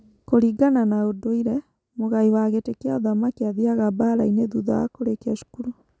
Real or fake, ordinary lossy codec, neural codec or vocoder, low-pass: real; none; none; none